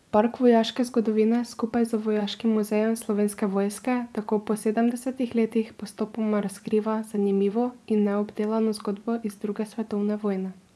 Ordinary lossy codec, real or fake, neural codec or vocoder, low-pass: none; fake; vocoder, 24 kHz, 100 mel bands, Vocos; none